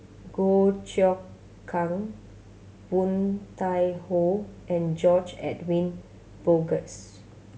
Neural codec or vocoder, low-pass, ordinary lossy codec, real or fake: none; none; none; real